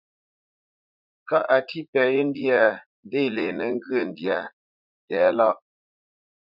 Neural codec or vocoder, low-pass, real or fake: vocoder, 44.1 kHz, 80 mel bands, Vocos; 5.4 kHz; fake